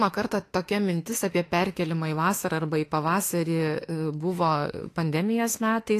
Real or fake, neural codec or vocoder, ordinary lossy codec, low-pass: fake; autoencoder, 48 kHz, 32 numbers a frame, DAC-VAE, trained on Japanese speech; AAC, 48 kbps; 14.4 kHz